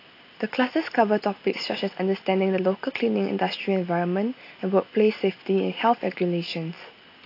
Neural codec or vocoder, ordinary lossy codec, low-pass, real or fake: none; AAC, 32 kbps; 5.4 kHz; real